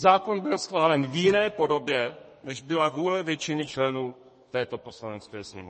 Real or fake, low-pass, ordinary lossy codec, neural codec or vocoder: fake; 10.8 kHz; MP3, 32 kbps; codec, 32 kHz, 1.9 kbps, SNAC